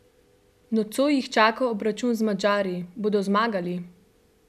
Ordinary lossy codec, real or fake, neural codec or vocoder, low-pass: none; real; none; 14.4 kHz